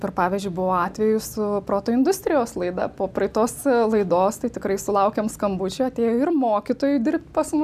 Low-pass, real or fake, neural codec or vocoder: 14.4 kHz; real; none